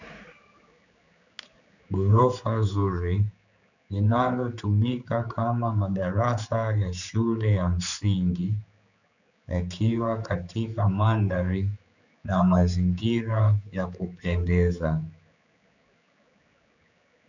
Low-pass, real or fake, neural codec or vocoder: 7.2 kHz; fake; codec, 16 kHz, 4 kbps, X-Codec, HuBERT features, trained on general audio